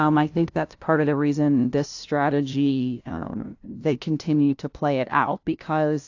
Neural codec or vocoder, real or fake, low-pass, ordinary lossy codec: codec, 16 kHz, 0.5 kbps, FunCodec, trained on LibriTTS, 25 frames a second; fake; 7.2 kHz; AAC, 48 kbps